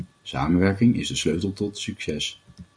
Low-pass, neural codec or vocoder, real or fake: 9.9 kHz; none; real